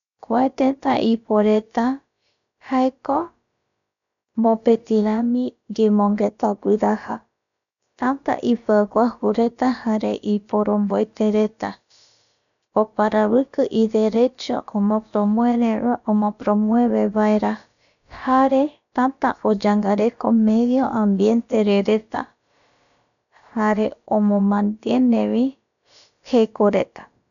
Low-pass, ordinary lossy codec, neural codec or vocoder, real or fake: 7.2 kHz; none; codec, 16 kHz, about 1 kbps, DyCAST, with the encoder's durations; fake